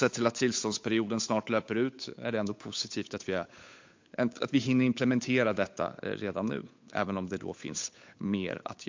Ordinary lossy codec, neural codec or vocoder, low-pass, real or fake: MP3, 48 kbps; codec, 16 kHz, 8 kbps, FunCodec, trained on Chinese and English, 25 frames a second; 7.2 kHz; fake